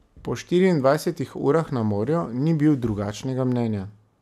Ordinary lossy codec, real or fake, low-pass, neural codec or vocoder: none; real; 14.4 kHz; none